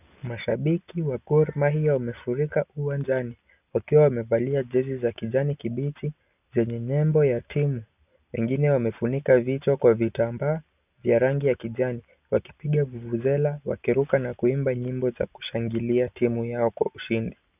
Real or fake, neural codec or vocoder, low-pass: real; none; 3.6 kHz